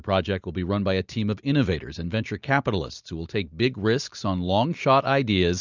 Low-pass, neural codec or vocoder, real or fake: 7.2 kHz; none; real